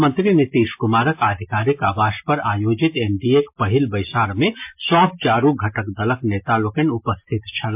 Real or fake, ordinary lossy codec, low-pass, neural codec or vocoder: real; MP3, 32 kbps; 3.6 kHz; none